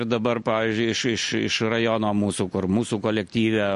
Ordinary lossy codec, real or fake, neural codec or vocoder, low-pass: MP3, 48 kbps; real; none; 14.4 kHz